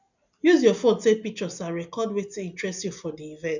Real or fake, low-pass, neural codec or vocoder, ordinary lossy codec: real; 7.2 kHz; none; none